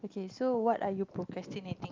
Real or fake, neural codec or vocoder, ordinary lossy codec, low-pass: real; none; Opus, 24 kbps; 7.2 kHz